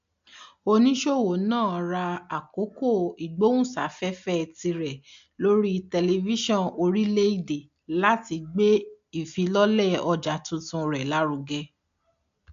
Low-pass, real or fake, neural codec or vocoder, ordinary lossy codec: 7.2 kHz; real; none; AAC, 64 kbps